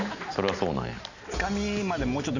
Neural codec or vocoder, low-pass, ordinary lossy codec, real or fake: none; 7.2 kHz; none; real